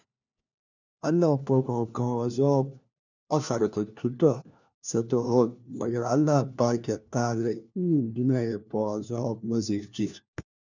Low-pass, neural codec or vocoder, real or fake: 7.2 kHz; codec, 16 kHz, 1 kbps, FunCodec, trained on LibriTTS, 50 frames a second; fake